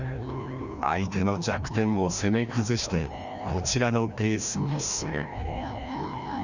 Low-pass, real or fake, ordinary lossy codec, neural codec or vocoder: 7.2 kHz; fake; none; codec, 16 kHz, 1 kbps, FreqCodec, larger model